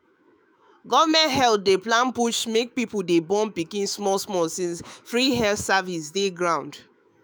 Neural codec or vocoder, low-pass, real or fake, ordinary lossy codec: autoencoder, 48 kHz, 128 numbers a frame, DAC-VAE, trained on Japanese speech; none; fake; none